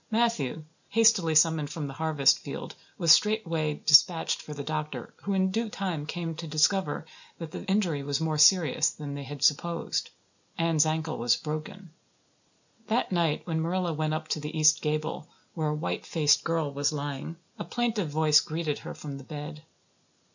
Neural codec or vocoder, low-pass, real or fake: none; 7.2 kHz; real